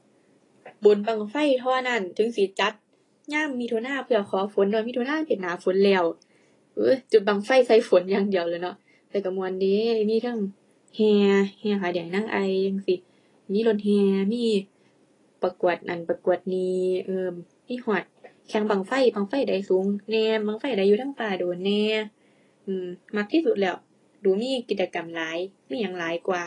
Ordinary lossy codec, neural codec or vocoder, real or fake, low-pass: AAC, 32 kbps; none; real; 10.8 kHz